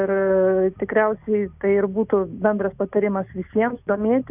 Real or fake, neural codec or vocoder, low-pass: real; none; 3.6 kHz